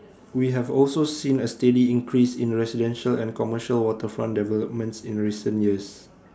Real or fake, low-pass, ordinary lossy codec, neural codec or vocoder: real; none; none; none